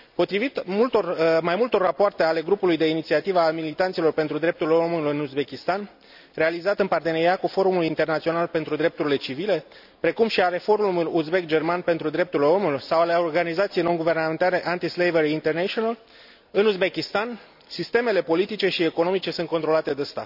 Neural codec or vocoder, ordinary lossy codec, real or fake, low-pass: none; none; real; 5.4 kHz